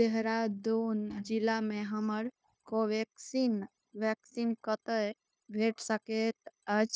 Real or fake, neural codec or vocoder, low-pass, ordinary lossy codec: fake; codec, 16 kHz, 0.9 kbps, LongCat-Audio-Codec; none; none